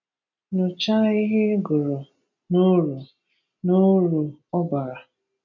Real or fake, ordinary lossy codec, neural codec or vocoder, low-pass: real; none; none; 7.2 kHz